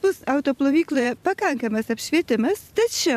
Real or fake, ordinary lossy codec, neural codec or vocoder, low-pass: fake; MP3, 96 kbps; vocoder, 44.1 kHz, 128 mel bands every 512 samples, BigVGAN v2; 14.4 kHz